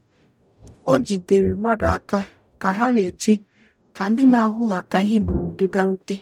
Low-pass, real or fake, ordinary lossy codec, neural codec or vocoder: 19.8 kHz; fake; none; codec, 44.1 kHz, 0.9 kbps, DAC